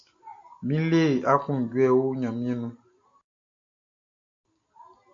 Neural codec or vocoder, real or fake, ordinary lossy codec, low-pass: none; real; AAC, 48 kbps; 7.2 kHz